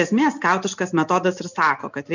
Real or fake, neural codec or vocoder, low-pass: real; none; 7.2 kHz